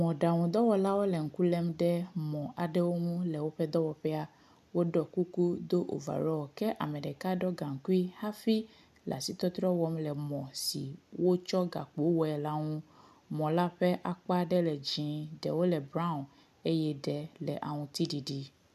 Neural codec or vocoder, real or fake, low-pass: none; real; 14.4 kHz